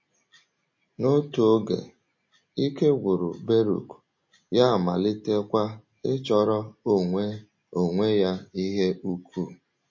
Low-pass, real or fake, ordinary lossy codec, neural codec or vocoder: 7.2 kHz; real; MP3, 32 kbps; none